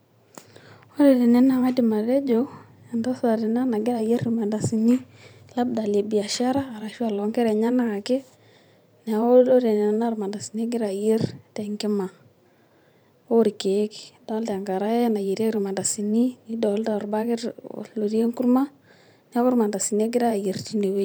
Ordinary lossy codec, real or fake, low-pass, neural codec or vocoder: none; fake; none; vocoder, 44.1 kHz, 128 mel bands every 512 samples, BigVGAN v2